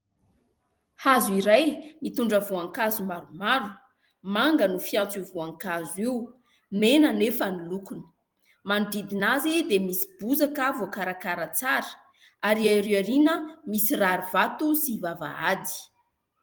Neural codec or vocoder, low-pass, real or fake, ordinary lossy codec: vocoder, 44.1 kHz, 128 mel bands every 256 samples, BigVGAN v2; 19.8 kHz; fake; Opus, 24 kbps